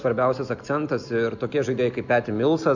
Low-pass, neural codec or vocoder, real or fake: 7.2 kHz; none; real